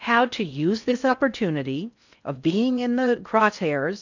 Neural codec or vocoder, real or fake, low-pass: codec, 16 kHz in and 24 kHz out, 0.6 kbps, FocalCodec, streaming, 4096 codes; fake; 7.2 kHz